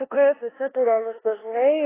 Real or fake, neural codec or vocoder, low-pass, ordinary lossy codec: fake; codec, 16 kHz in and 24 kHz out, 0.9 kbps, LongCat-Audio-Codec, four codebook decoder; 3.6 kHz; AAC, 16 kbps